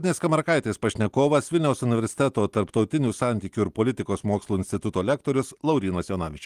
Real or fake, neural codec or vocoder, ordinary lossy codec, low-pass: real; none; Opus, 24 kbps; 14.4 kHz